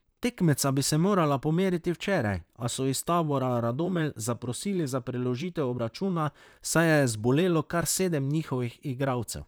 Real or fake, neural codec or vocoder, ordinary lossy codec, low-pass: fake; vocoder, 44.1 kHz, 128 mel bands, Pupu-Vocoder; none; none